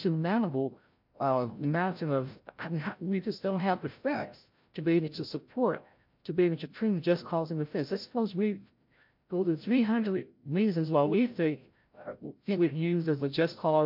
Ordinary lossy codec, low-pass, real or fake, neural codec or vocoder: MP3, 48 kbps; 5.4 kHz; fake; codec, 16 kHz, 0.5 kbps, FreqCodec, larger model